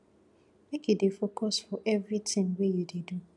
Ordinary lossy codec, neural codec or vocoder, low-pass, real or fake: none; none; 10.8 kHz; real